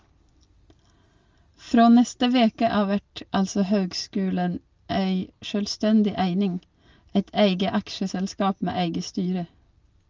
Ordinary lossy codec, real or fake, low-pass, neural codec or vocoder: Opus, 32 kbps; real; 7.2 kHz; none